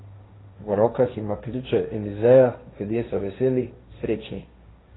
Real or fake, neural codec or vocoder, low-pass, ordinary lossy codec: fake; codec, 16 kHz, 1.1 kbps, Voila-Tokenizer; 7.2 kHz; AAC, 16 kbps